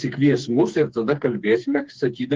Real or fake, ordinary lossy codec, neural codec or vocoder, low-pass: fake; Opus, 16 kbps; codec, 16 kHz, 4 kbps, FreqCodec, smaller model; 7.2 kHz